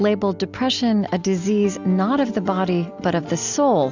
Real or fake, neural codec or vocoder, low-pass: real; none; 7.2 kHz